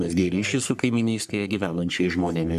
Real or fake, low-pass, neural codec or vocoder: fake; 14.4 kHz; codec, 44.1 kHz, 3.4 kbps, Pupu-Codec